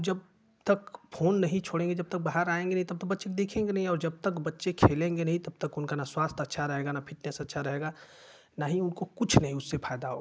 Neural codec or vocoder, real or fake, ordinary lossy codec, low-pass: none; real; none; none